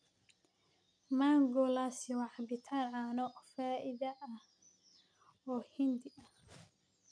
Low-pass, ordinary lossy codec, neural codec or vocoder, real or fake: 9.9 kHz; none; none; real